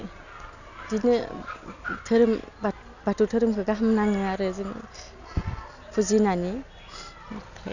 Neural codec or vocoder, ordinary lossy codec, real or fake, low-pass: none; none; real; 7.2 kHz